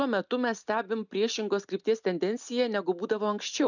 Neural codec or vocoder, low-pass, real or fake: none; 7.2 kHz; real